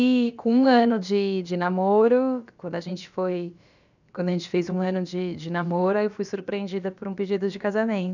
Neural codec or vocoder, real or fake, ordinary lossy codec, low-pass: codec, 16 kHz, about 1 kbps, DyCAST, with the encoder's durations; fake; none; 7.2 kHz